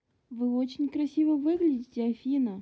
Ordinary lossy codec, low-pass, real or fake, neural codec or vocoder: none; none; real; none